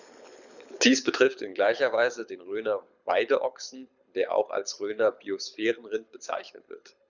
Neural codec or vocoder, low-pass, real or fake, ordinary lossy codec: codec, 24 kHz, 6 kbps, HILCodec; 7.2 kHz; fake; none